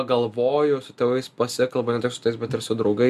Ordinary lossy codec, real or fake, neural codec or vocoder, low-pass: MP3, 96 kbps; real; none; 14.4 kHz